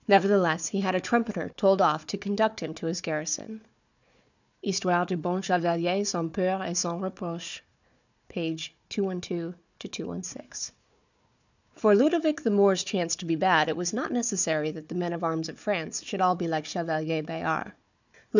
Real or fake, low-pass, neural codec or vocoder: fake; 7.2 kHz; codec, 16 kHz, 4 kbps, FunCodec, trained on Chinese and English, 50 frames a second